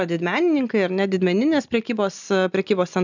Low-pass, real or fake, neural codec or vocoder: 7.2 kHz; real; none